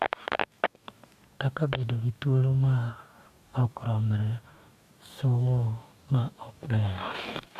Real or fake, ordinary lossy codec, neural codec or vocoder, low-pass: fake; AAC, 96 kbps; codec, 44.1 kHz, 2.6 kbps, DAC; 14.4 kHz